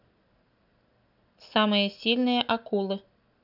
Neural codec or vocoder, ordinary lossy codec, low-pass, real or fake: none; none; 5.4 kHz; real